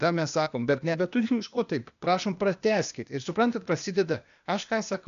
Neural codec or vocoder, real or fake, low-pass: codec, 16 kHz, 0.8 kbps, ZipCodec; fake; 7.2 kHz